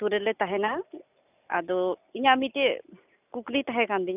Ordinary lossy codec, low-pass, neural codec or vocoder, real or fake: none; 3.6 kHz; none; real